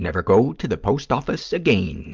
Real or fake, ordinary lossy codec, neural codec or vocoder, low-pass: real; Opus, 32 kbps; none; 7.2 kHz